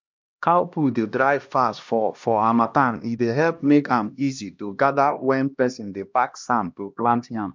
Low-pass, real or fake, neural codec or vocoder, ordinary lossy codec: 7.2 kHz; fake; codec, 16 kHz, 1 kbps, X-Codec, HuBERT features, trained on LibriSpeech; MP3, 64 kbps